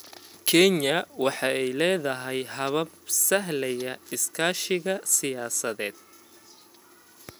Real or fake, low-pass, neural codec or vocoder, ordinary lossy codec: real; none; none; none